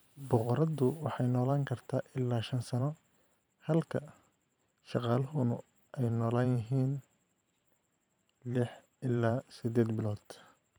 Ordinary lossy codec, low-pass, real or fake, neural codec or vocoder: none; none; fake; vocoder, 44.1 kHz, 128 mel bands every 512 samples, BigVGAN v2